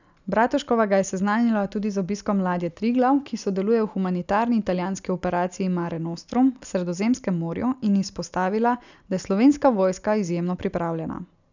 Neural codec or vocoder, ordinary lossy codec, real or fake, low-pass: none; none; real; 7.2 kHz